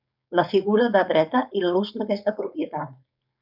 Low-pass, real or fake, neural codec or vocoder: 5.4 kHz; fake; codec, 16 kHz, 4.8 kbps, FACodec